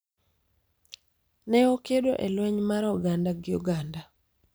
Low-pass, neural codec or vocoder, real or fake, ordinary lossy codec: none; vocoder, 44.1 kHz, 128 mel bands every 256 samples, BigVGAN v2; fake; none